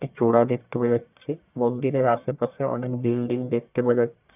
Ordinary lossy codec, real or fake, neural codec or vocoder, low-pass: none; fake; codec, 44.1 kHz, 1.7 kbps, Pupu-Codec; 3.6 kHz